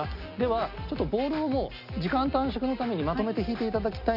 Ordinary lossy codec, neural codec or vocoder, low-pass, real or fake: AAC, 48 kbps; none; 5.4 kHz; real